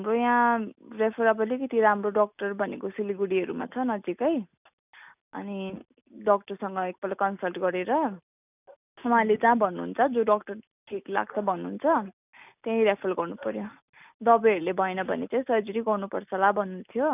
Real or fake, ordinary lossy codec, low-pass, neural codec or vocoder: real; none; 3.6 kHz; none